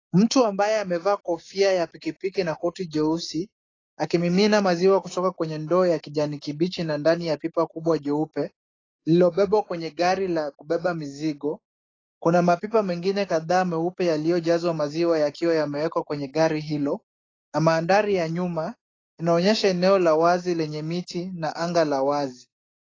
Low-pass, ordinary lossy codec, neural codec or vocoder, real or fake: 7.2 kHz; AAC, 32 kbps; codec, 16 kHz, 6 kbps, DAC; fake